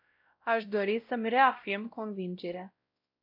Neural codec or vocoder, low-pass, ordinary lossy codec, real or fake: codec, 16 kHz, 0.5 kbps, X-Codec, WavLM features, trained on Multilingual LibriSpeech; 5.4 kHz; AAC, 48 kbps; fake